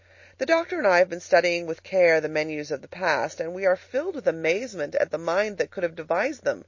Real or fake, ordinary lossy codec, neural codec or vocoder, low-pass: real; MP3, 32 kbps; none; 7.2 kHz